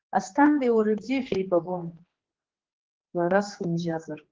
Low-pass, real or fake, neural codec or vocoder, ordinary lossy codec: 7.2 kHz; fake; codec, 16 kHz, 2 kbps, X-Codec, HuBERT features, trained on general audio; Opus, 16 kbps